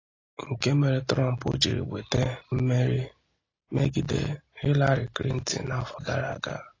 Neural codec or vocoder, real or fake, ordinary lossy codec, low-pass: none; real; AAC, 32 kbps; 7.2 kHz